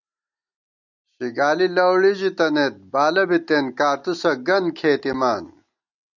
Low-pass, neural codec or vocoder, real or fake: 7.2 kHz; none; real